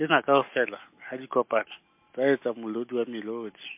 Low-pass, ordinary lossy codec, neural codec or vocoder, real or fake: 3.6 kHz; MP3, 24 kbps; none; real